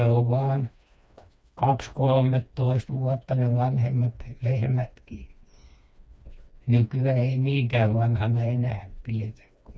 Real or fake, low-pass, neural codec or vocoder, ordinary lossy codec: fake; none; codec, 16 kHz, 2 kbps, FreqCodec, smaller model; none